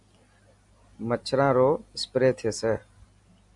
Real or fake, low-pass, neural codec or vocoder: real; 10.8 kHz; none